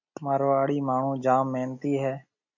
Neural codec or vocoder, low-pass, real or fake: none; 7.2 kHz; real